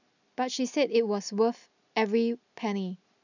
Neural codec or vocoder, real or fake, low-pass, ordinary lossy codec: none; real; 7.2 kHz; none